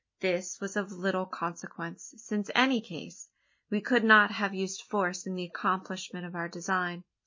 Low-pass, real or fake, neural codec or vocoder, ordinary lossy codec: 7.2 kHz; real; none; MP3, 32 kbps